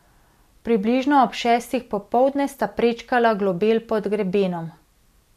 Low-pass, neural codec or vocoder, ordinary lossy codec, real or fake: 14.4 kHz; none; none; real